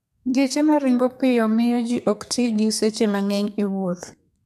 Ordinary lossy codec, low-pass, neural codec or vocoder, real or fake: none; 14.4 kHz; codec, 32 kHz, 1.9 kbps, SNAC; fake